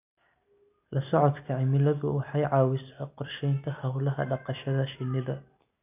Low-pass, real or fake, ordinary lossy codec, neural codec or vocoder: 3.6 kHz; real; none; none